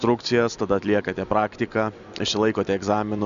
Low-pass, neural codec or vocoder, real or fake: 7.2 kHz; none; real